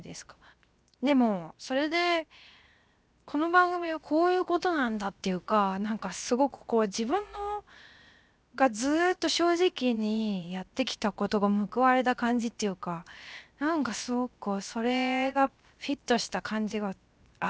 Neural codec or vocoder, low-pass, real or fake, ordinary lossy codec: codec, 16 kHz, 0.7 kbps, FocalCodec; none; fake; none